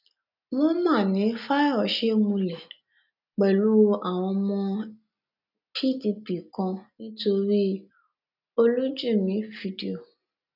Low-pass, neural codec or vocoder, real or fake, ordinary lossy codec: 5.4 kHz; none; real; none